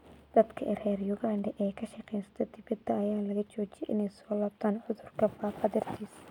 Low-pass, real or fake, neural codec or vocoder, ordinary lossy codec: 19.8 kHz; real; none; none